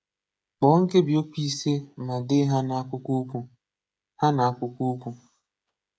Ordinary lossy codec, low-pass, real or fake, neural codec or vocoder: none; none; fake; codec, 16 kHz, 16 kbps, FreqCodec, smaller model